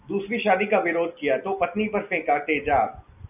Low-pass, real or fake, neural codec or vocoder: 3.6 kHz; real; none